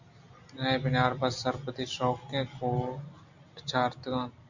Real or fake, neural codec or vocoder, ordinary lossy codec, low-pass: real; none; Opus, 64 kbps; 7.2 kHz